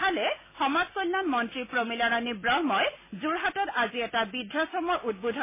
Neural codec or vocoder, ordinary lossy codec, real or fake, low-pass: none; MP3, 16 kbps; real; 3.6 kHz